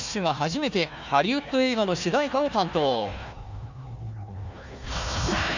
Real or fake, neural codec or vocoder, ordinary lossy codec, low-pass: fake; codec, 16 kHz, 1 kbps, FunCodec, trained on Chinese and English, 50 frames a second; none; 7.2 kHz